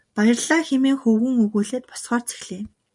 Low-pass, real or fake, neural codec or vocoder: 10.8 kHz; real; none